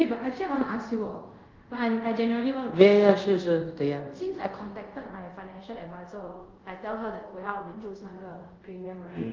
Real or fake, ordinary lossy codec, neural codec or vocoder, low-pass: fake; Opus, 24 kbps; codec, 24 kHz, 0.5 kbps, DualCodec; 7.2 kHz